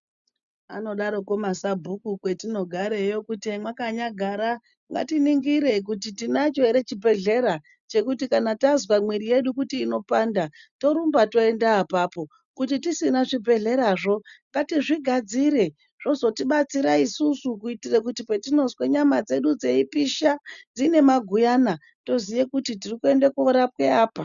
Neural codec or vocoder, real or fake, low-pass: none; real; 7.2 kHz